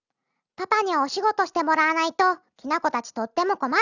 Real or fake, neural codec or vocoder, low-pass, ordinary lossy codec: real; none; 7.2 kHz; none